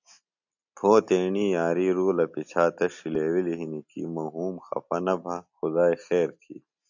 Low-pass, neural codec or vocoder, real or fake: 7.2 kHz; none; real